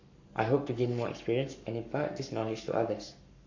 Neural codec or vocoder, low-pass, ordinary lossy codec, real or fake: codec, 44.1 kHz, 7.8 kbps, Pupu-Codec; 7.2 kHz; none; fake